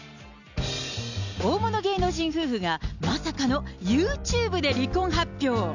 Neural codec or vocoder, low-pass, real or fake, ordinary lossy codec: none; 7.2 kHz; real; none